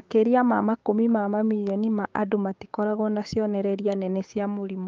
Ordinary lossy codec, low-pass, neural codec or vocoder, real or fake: Opus, 32 kbps; 7.2 kHz; codec, 16 kHz, 6 kbps, DAC; fake